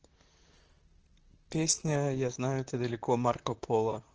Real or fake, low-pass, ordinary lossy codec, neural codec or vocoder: fake; 7.2 kHz; Opus, 16 kbps; vocoder, 44.1 kHz, 128 mel bands, Pupu-Vocoder